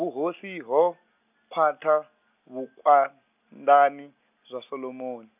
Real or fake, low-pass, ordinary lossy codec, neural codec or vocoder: real; 3.6 kHz; none; none